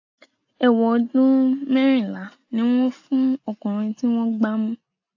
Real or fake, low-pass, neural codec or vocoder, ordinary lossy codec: real; 7.2 kHz; none; AAC, 48 kbps